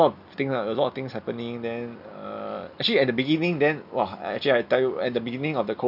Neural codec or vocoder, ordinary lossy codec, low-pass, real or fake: none; none; 5.4 kHz; real